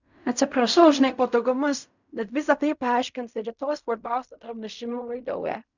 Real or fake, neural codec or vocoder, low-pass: fake; codec, 16 kHz in and 24 kHz out, 0.4 kbps, LongCat-Audio-Codec, fine tuned four codebook decoder; 7.2 kHz